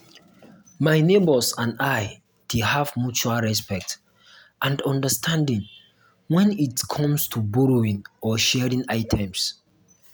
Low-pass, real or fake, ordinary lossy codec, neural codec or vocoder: none; real; none; none